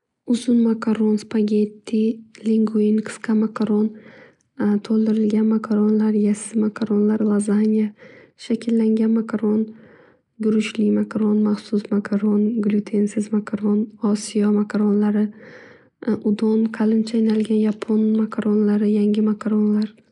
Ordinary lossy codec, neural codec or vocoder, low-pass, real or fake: none; none; 10.8 kHz; real